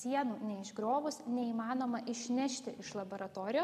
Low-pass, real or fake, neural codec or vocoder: 14.4 kHz; real; none